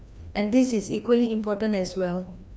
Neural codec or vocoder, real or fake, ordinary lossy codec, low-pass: codec, 16 kHz, 1 kbps, FreqCodec, larger model; fake; none; none